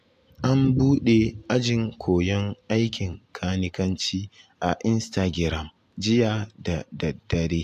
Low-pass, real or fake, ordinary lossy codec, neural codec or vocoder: 14.4 kHz; real; AAC, 96 kbps; none